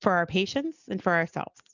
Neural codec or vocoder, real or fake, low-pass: none; real; 7.2 kHz